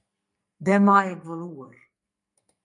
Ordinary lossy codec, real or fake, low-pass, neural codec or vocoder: MP3, 64 kbps; fake; 10.8 kHz; codec, 44.1 kHz, 2.6 kbps, SNAC